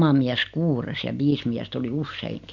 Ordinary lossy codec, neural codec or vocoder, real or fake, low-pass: none; none; real; 7.2 kHz